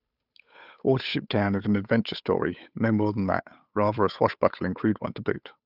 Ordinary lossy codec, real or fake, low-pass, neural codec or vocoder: none; fake; 5.4 kHz; codec, 16 kHz, 8 kbps, FunCodec, trained on Chinese and English, 25 frames a second